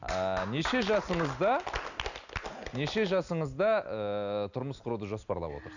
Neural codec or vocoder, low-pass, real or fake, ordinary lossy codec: none; 7.2 kHz; real; none